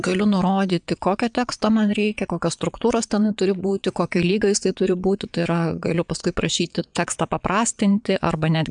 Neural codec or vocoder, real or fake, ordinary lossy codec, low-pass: vocoder, 22.05 kHz, 80 mel bands, WaveNeXt; fake; MP3, 96 kbps; 9.9 kHz